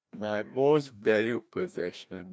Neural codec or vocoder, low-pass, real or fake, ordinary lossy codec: codec, 16 kHz, 1 kbps, FreqCodec, larger model; none; fake; none